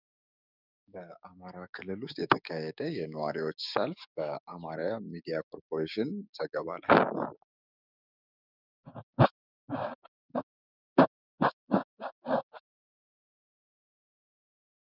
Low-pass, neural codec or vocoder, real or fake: 5.4 kHz; codec, 16 kHz, 6 kbps, DAC; fake